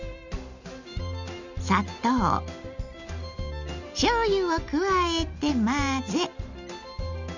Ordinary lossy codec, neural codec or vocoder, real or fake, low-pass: none; none; real; 7.2 kHz